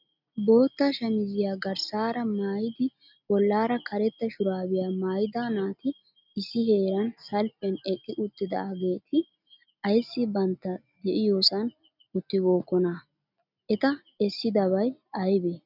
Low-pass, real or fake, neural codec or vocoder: 5.4 kHz; real; none